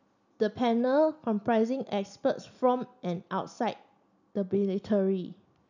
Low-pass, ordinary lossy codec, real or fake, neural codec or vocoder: 7.2 kHz; none; real; none